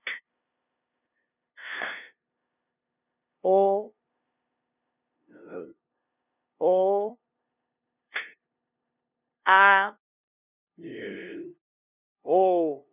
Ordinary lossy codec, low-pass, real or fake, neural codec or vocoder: none; 3.6 kHz; fake; codec, 16 kHz, 0.5 kbps, FunCodec, trained on LibriTTS, 25 frames a second